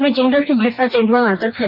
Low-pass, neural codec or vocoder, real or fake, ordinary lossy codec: 5.4 kHz; codec, 44.1 kHz, 2.6 kbps, DAC; fake; none